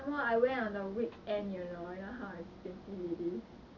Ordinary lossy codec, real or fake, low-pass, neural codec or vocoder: none; real; 7.2 kHz; none